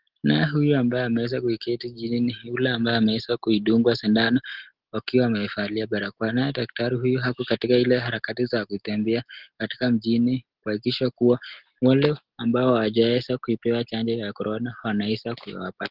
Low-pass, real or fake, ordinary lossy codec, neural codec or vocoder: 5.4 kHz; real; Opus, 16 kbps; none